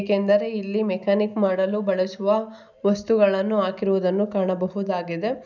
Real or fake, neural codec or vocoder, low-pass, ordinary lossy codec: real; none; 7.2 kHz; none